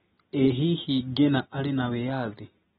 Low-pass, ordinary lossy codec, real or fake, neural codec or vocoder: 7.2 kHz; AAC, 16 kbps; real; none